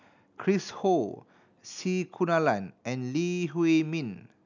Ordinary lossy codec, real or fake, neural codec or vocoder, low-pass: none; real; none; 7.2 kHz